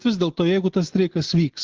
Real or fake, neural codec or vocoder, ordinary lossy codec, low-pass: real; none; Opus, 16 kbps; 7.2 kHz